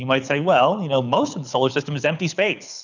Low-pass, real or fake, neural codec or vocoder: 7.2 kHz; fake; codec, 24 kHz, 6 kbps, HILCodec